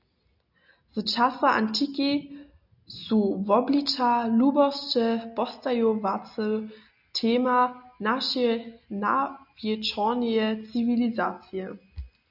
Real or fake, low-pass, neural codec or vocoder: real; 5.4 kHz; none